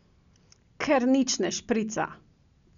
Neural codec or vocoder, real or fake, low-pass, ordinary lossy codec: none; real; 7.2 kHz; none